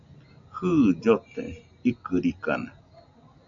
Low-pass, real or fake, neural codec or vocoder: 7.2 kHz; real; none